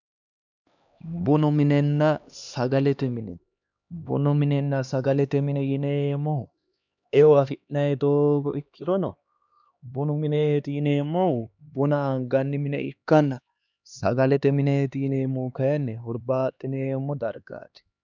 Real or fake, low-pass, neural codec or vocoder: fake; 7.2 kHz; codec, 16 kHz, 2 kbps, X-Codec, HuBERT features, trained on LibriSpeech